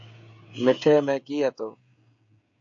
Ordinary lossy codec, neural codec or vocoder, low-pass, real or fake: AAC, 64 kbps; codec, 16 kHz, 16 kbps, FreqCodec, smaller model; 7.2 kHz; fake